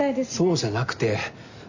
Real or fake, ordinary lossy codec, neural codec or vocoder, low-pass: real; none; none; 7.2 kHz